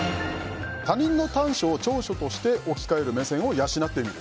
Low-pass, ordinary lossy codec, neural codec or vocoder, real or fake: none; none; none; real